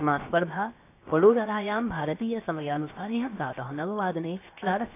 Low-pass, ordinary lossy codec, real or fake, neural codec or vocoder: 3.6 kHz; AAC, 24 kbps; fake; codec, 16 kHz, 0.7 kbps, FocalCodec